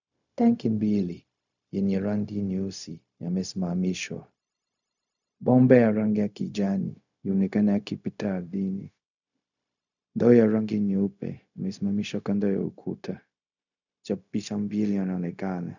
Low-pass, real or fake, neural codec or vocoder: 7.2 kHz; fake; codec, 16 kHz, 0.4 kbps, LongCat-Audio-Codec